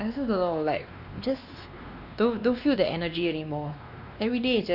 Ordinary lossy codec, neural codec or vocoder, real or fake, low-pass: none; codec, 16 kHz, 2 kbps, X-Codec, WavLM features, trained on Multilingual LibriSpeech; fake; 5.4 kHz